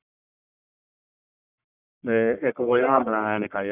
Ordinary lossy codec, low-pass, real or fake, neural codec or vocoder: none; 3.6 kHz; fake; codec, 44.1 kHz, 1.7 kbps, Pupu-Codec